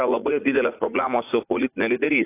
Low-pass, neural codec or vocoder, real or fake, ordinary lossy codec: 3.6 kHz; vocoder, 44.1 kHz, 80 mel bands, Vocos; fake; AAC, 24 kbps